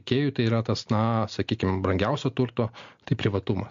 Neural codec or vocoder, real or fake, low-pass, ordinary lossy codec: none; real; 7.2 kHz; MP3, 48 kbps